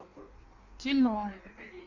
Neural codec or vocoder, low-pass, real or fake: codec, 16 kHz in and 24 kHz out, 1.1 kbps, FireRedTTS-2 codec; 7.2 kHz; fake